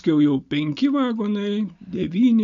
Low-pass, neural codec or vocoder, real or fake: 7.2 kHz; none; real